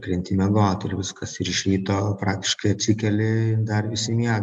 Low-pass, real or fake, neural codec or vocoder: 10.8 kHz; real; none